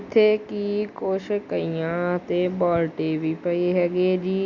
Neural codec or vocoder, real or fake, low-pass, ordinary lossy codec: none; real; 7.2 kHz; Opus, 64 kbps